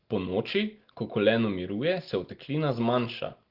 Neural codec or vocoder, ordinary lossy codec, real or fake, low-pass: none; Opus, 16 kbps; real; 5.4 kHz